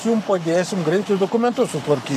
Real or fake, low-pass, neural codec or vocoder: fake; 14.4 kHz; codec, 44.1 kHz, 7.8 kbps, Pupu-Codec